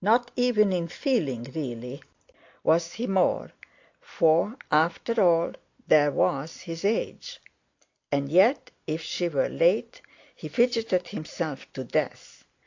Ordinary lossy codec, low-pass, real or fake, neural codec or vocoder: AAC, 48 kbps; 7.2 kHz; real; none